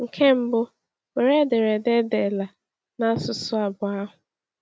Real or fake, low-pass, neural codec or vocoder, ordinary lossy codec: real; none; none; none